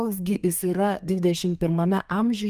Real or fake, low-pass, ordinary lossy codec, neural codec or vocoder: fake; 14.4 kHz; Opus, 32 kbps; codec, 32 kHz, 1.9 kbps, SNAC